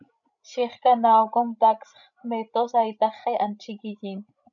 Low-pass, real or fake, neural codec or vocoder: 7.2 kHz; fake; codec, 16 kHz, 16 kbps, FreqCodec, larger model